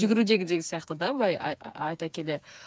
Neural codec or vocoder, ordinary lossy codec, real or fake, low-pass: codec, 16 kHz, 4 kbps, FreqCodec, smaller model; none; fake; none